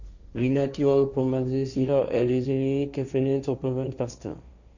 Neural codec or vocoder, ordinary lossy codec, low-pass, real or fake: codec, 16 kHz, 1.1 kbps, Voila-Tokenizer; none; 7.2 kHz; fake